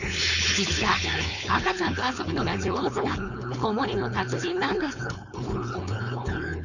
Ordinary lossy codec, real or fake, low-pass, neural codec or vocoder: none; fake; 7.2 kHz; codec, 16 kHz, 4.8 kbps, FACodec